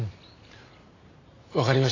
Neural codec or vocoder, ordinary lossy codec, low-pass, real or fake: none; AAC, 32 kbps; 7.2 kHz; real